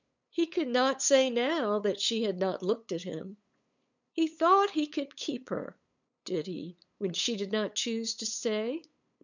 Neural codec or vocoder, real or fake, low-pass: codec, 16 kHz, 8 kbps, FunCodec, trained on LibriTTS, 25 frames a second; fake; 7.2 kHz